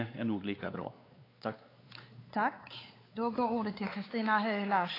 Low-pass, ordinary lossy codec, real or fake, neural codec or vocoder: 5.4 kHz; AAC, 24 kbps; fake; codec, 16 kHz, 4 kbps, X-Codec, WavLM features, trained on Multilingual LibriSpeech